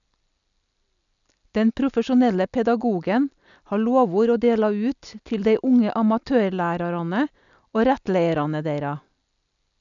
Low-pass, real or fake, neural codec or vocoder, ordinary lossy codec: 7.2 kHz; real; none; none